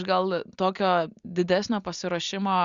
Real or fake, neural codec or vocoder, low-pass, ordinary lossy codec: real; none; 7.2 kHz; Opus, 64 kbps